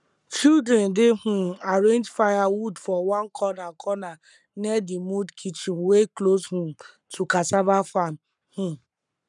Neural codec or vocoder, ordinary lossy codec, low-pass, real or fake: codec, 44.1 kHz, 7.8 kbps, Pupu-Codec; none; 10.8 kHz; fake